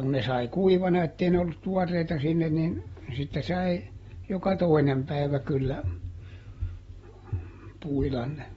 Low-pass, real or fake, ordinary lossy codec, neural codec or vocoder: 19.8 kHz; real; AAC, 24 kbps; none